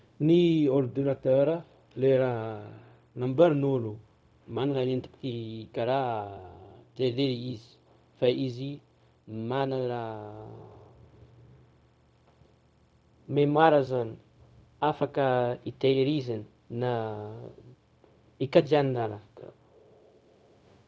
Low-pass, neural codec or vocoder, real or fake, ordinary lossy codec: none; codec, 16 kHz, 0.4 kbps, LongCat-Audio-Codec; fake; none